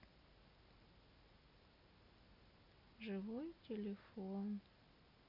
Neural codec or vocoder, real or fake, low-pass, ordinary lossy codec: none; real; 5.4 kHz; none